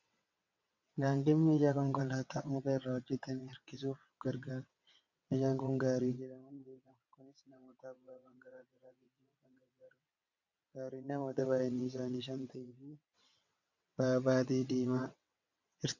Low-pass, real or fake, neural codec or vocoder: 7.2 kHz; fake; vocoder, 22.05 kHz, 80 mel bands, WaveNeXt